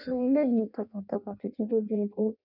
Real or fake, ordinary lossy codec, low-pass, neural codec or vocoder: fake; none; 5.4 kHz; codec, 16 kHz in and 24 kHz out, 0.6 kbps, FireRedTTS-2 codec